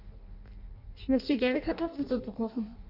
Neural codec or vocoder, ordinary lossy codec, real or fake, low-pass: codec, 16 kHz in and 24 kHz out, 0.6 kbps, FireRedTTS-2 codec; none; fake; 5.4 kHz